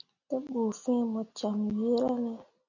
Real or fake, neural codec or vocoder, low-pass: real; none; 7.2 kHz